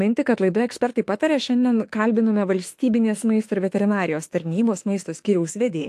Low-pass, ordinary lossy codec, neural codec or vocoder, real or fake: 14.4 kHz; AAC, 64 kbps; autoencoder, 48 kHz, 32 numbers a frame, DAC-VAE, trained on Japanese speech; fake